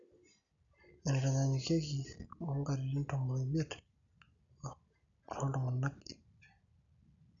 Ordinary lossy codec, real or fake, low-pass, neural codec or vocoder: none; real; 7.2 kHz; none